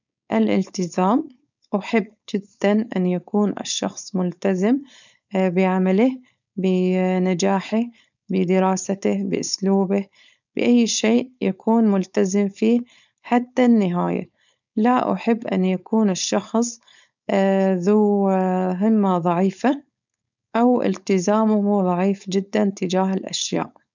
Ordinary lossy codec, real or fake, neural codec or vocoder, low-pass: none; fake; codec, 16 kHz, 4.8 kbps, FACodec; 7.2 kHz